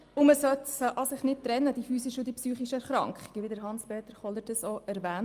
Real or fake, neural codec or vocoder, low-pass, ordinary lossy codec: real; none; 14.4 kHz; Opus, 32 kbps